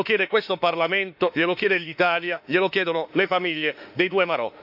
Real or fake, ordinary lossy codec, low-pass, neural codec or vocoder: fake; none; 5.4 kHz; autoencoder, 48 kHz, 32 numbers a frame, DAC-VAE, trained on Japanese speech